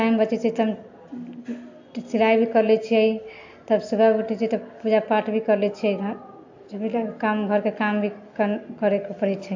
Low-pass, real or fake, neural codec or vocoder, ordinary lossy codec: 7.2 kHz; real; none; AAC, 48 kbps